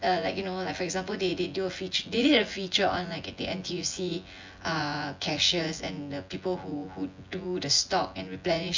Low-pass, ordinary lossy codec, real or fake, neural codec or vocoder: 7.2 kHz; MP3, 64 kbps; fake; vocoder, 24 kHz, 100 mel bands, Vocos